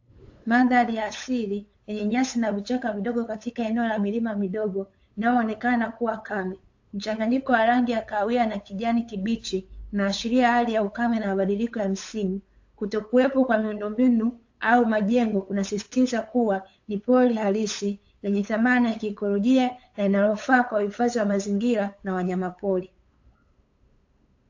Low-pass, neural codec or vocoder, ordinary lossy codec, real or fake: 7.2 kHz; codec, 16 kHz, 8 kbps, FunCodec, trained on LibriTTS, 25 frames a second; AAC, 48 kbps; fake